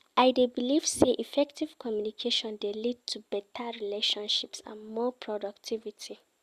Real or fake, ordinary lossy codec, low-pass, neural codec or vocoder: real; Opus, 64 kbps; 14.4 kHz; none